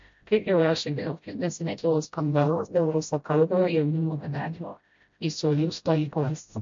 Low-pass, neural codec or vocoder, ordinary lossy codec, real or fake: 7.2 kHz; codec, 16 kHz, 0.5 kbps, FreqCodec, smaller model; MP3, 48 kbps; fake